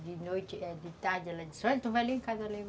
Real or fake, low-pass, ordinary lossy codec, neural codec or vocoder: real; none; none; none